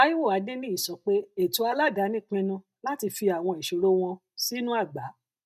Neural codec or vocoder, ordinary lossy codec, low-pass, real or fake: none; none; 14.4 kHz; real